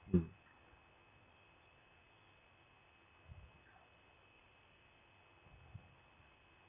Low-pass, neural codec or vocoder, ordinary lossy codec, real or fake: 3.6 kHz; none; none; real